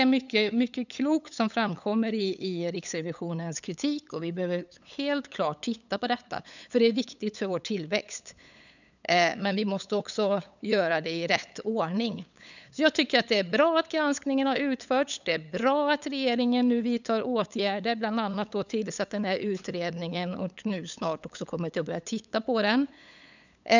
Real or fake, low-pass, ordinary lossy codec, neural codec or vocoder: fake; 7.2 kHz; none; codec, 16 kHz, 8 kbps, FunCodec, trained on LibriTTS, 25 frames a second